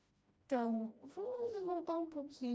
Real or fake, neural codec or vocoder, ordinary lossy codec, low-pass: fake; codec, 16 kHz, 1 kbps, FreqCodec, smaller model; none; none